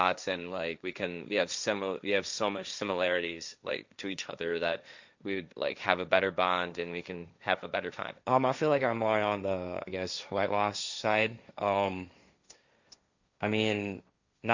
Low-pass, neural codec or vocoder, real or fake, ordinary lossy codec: 7.2 kHz; codec, 16 kHz, 1.1 kbps, Voila-Tokenizer; fake; Opus, 64 kbps